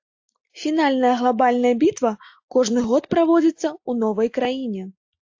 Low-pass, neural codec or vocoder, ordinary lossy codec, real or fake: 7.2 kHz; none; AAC, 48 kbps; real